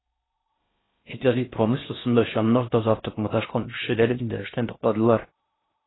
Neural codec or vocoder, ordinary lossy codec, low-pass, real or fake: codec, 16 kHz in and 24 kHz out, 0.6 kbps, FocalCodec, streaming, 4096 codes; AAC, 16 kbps; 7.2 kHz; fake